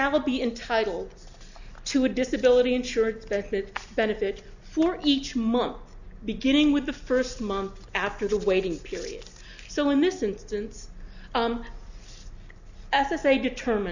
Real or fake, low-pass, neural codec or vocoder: real; 7.2 kHz; none